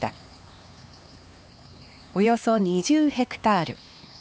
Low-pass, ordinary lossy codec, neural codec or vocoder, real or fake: none; none; codec, 16 kHz, 2 kbps, X-Codec, HuBERT features, trained on LibriSpeech; fake